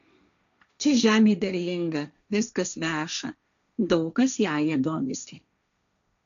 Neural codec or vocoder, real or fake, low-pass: codec, 16 kHz, 1.1 kbps, Voila-Tokenizer; fake; 7.2 kHz